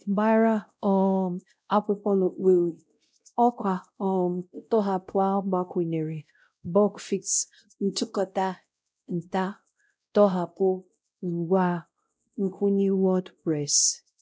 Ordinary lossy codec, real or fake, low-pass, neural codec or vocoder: none; fake; none; codec, 16 kHz, 0.5 kbps, X-Codec, WavLM features, trained on Multilingual LibriSpeech